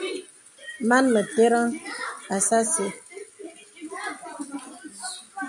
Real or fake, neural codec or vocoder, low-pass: real; none; 10.8 kHz